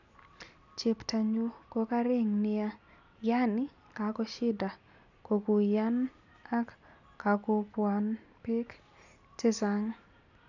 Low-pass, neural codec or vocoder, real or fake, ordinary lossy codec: 7.2 kHz; none; real; none